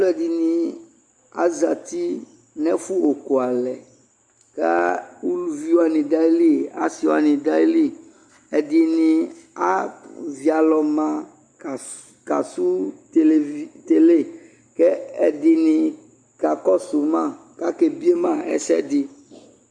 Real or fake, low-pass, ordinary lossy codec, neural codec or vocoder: real; 9.9 kHz; Opus, 64 kbps; none